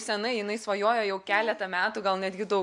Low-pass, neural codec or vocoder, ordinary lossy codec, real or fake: 10.8 kHz; none; MP3, 64 kbps; real